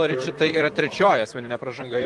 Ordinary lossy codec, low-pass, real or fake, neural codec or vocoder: Opus, 24 kbps; 10.8 kHz; fake; vocoder, 44.1 kHz, 128 mel bands, Pupu-Vocoder